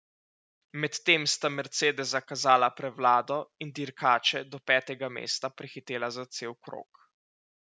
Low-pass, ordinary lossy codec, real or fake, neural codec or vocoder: none; none; real; none